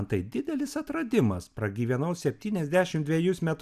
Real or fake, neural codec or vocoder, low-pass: real; none; 14.4 kHz